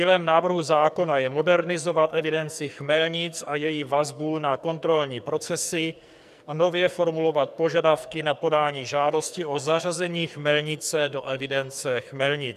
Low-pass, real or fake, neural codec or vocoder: 14.4 kHz; fake; codec, 44.1 kHz, 2.6 kbps, SNAC